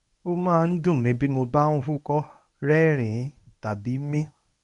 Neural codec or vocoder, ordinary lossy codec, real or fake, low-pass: codec, 24 kHz, 0.9 kbps, WavTokenizer, medium speech release version 1; none; fake; 10.8 kHz